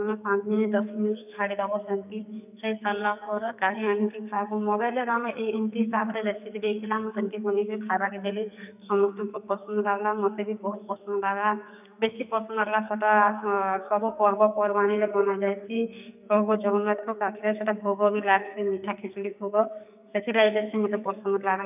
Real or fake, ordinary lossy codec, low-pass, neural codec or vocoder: fake; none; 3.6 kHz; codec, 44.1 kHz, 2.6 kbps, SNAC